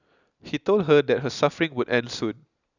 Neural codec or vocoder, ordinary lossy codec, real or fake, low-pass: none; none; real; 7.2 kHz